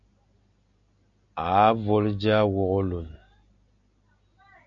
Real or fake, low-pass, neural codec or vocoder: real; 7.2 kHz; none